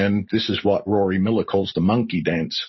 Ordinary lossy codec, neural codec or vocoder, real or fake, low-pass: MP3, 24 kbps; none; real; 7.2 kHz